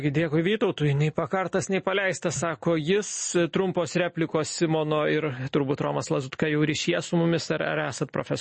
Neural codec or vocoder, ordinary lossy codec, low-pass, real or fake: none; MP3, 32 kbps; 10.8 kHz; real